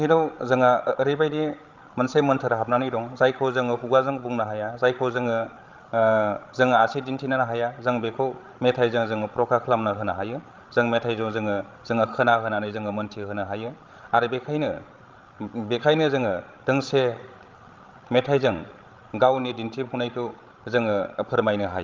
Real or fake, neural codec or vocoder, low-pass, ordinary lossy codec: fake; codec, 16 kHz, 16 kbps, FreqCodec, larger model; 7.2 kHz; Opus, 24 kbps